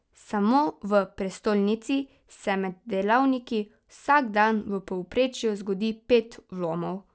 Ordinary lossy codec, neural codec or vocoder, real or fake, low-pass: none; none; real; none